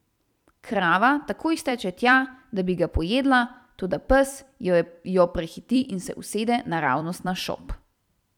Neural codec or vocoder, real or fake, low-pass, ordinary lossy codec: vocoder, 44.1 kHz, 128 mel bands every 256 samples, BigVGAN v2; fake; 19.8 kHz; none